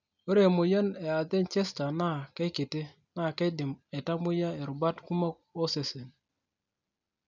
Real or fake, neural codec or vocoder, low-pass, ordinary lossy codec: real; none; 7.2 kHz; none